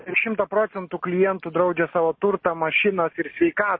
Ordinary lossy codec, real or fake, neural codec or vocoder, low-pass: MP3, 24 kbps; real; none; 7.2 kHz